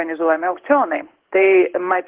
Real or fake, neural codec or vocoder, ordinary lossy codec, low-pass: fake; codec, 16 kHz in and 24 kHz out, 1 kbps, XY-Tokenizer; Opus, 16 kbps; 3.6 kHz